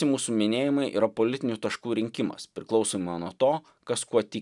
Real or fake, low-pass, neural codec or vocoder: real; 10.8 kHz; none